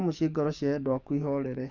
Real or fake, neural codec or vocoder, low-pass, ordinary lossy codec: fake; codec, 44.1 kHz, 7.8 kbps, DAC; 7.2 kHz; none